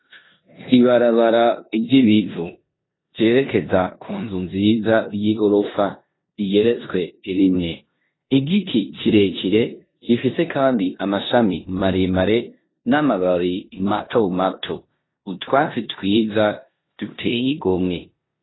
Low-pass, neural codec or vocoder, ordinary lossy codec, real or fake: 7.2 kHz; codec, 16 kHz in and 24 kHz out, 0.9 kbps, LongCat-Audio-Codec, four codebook decoder; AAC, 16 kbps; fake